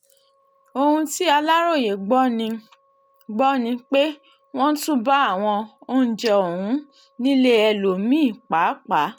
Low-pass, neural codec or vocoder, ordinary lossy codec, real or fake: 19.8 kHz; none; none; real